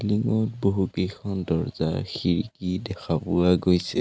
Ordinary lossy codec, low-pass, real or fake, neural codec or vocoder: none; none; real; none